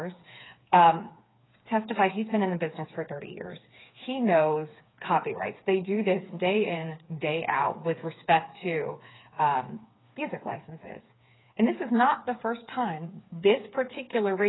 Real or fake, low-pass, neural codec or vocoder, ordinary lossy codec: fake; 7.2 kHz; codec, 16 kHz, 4 kbps, FreqCodec, smaller model; AAC, 16 kbps